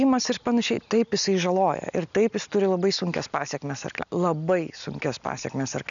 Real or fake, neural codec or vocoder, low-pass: real; none; 7.2 kHz